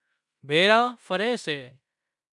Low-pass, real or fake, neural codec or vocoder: 10.8 kHz; fake; codec, 16 kHz in and 24 kHz out, 0.9 kbps, LongCat-Audio-Codec, fine tuned four codebook decoder